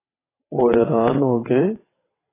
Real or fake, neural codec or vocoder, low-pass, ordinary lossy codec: fake; vocoder, 22.05 kHz, 80 mel bands, WaveNeXt; 3.6 kHz; AAC, 16 kbps